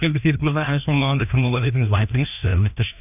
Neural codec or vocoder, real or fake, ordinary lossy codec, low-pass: codec, 16 kHz, 1 kbps, FreqCodec, larger model; fake; none; 3.6 kHz